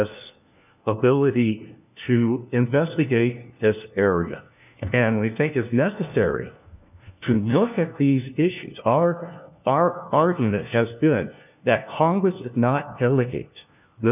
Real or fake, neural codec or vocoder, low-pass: fake; codec, 16 kHz, 1 kbps, FunCodec, trained on LibriTTS, 50 frames a second; 3.6 kHz